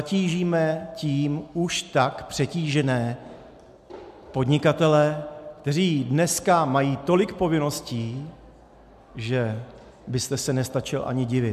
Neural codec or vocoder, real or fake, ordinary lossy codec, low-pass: none; real; MP3, 96 kbps; 14.4 kHz